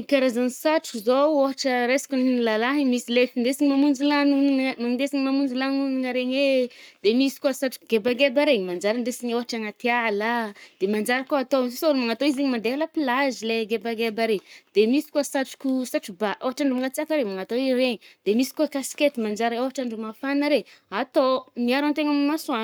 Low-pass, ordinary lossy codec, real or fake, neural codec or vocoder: none; none; fake; codec, 44.1 kHz, 7.8 kbps, Pupu-Codec